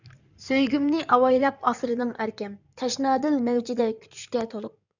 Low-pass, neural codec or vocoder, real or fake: 7.2 kHz; codec, 16 kHz in and 24 kHz out, 2.2 kbps, FireRedTTS-2 codec; fake